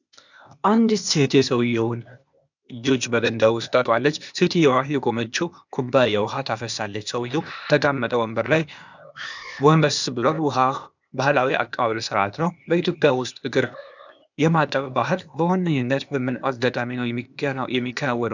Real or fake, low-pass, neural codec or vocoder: fake; 7.2 kHz; codec, 16 kHz, 0.8 kbps, ZipCodec